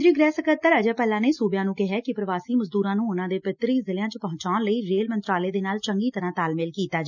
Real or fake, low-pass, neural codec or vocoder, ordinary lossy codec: real; 7.2 kHz; none; none